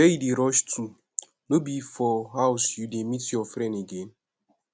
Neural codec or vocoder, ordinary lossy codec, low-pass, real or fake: none; none; none; real